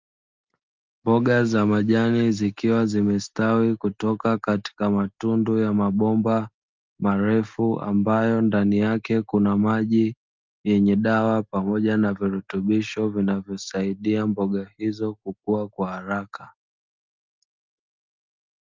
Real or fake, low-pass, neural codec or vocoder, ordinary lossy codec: real; 7.2 kHz; none; Opus, 24 kbps